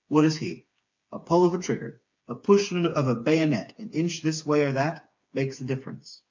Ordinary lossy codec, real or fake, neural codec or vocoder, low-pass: MP3, 48 kbps; fake; codec, 16 kHz, 4 kbps, FreqCodec, smaller model; 7.2 kHz